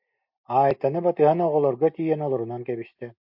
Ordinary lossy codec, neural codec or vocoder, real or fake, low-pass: MP3, 48 kbps; none; real; 5.4 kHz